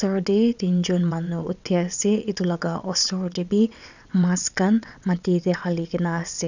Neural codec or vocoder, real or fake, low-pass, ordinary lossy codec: vocoder, 22.05 kHz, 80 mel bands, WaveNeXt; fake; 7.2 kHz; none